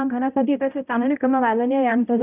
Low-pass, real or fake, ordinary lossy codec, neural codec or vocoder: 3.6 kHz; fake; none; codec, 16 kHz, 0.5 kbps, X-Codec, HuBERT features, trained on balanced general audio